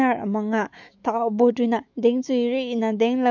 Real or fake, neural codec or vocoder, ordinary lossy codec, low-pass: fake; autoencoder, 48 kHz, 128 numbers a frame, DAC-VAE, trained on Japanese speech; none; 7.2 kHz